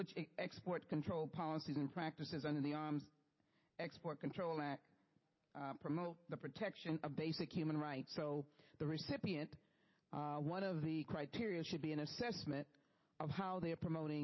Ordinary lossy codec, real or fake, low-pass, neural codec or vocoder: MP3, 24 kbps; real; 7.2 kHz; none